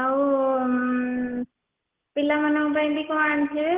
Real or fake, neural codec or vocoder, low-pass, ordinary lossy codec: real; none; 3.6 kHz; Opus, 16 kbps